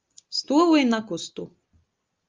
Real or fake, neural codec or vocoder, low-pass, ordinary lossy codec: real; none; 7.2 kHz; Opus, 24 kbps